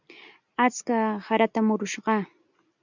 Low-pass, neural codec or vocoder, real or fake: 7.2 kHz; none; real